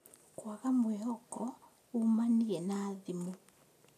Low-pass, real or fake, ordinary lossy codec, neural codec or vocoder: 14.4 kHz; real; none; none